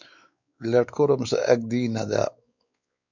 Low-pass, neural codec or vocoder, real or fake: 7.2 kHz; codec, 16 kHz, 4 kbps, X-Codec, WavLM features, trained on Multilingual LibriSpeech; fake